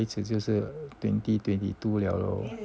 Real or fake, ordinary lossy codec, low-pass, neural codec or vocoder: real; none; none; none